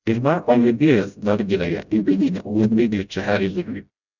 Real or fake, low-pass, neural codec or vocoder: fake; 7.2 kHz; codec, 16 kHz, 0.5 kbps, FreqCodec, smaller model